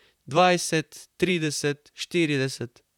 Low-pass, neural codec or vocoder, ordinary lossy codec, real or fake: 19.8 kHz; vocoder, 44.1 kHz, 128 mel bands, Pupu-Vocoder; none; fake